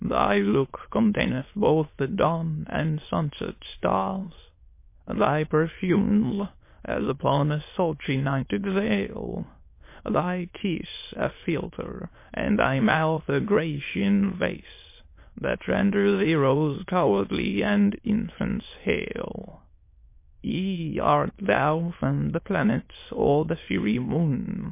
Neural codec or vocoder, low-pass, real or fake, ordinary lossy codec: autoencoder, 22.05 kHz, a latent of 192 numbers a frame, VITS, trained on many speakers; 3.6 kHz; fake; MP3, 24 kbps